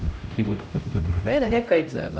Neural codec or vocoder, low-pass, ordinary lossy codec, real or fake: codec, 16 kHz, 0.5 kbps, X-Codec, HuBERT features, trained on LibriSpeech; none; none; fake